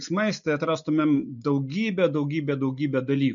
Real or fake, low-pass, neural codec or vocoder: real; 7.2 kHz; none